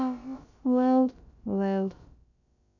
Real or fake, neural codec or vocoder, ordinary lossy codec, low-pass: fake; codec, 16 kHz, about 1 kbps, DyCAST, with the encoder's durations; none; 7.2 kHz